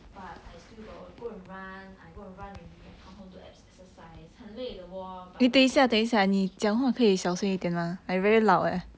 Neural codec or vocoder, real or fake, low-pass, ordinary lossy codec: none; real; none; none